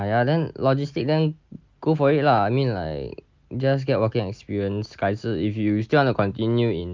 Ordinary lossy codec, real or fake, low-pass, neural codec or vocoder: Opus, 32 kbps; real; 7.2 kHz; none